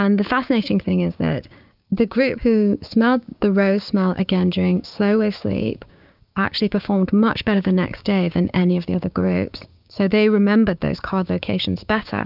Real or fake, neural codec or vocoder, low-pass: fake; codec, 16 kHz, 6 kbps, DAC; 5.4 kHz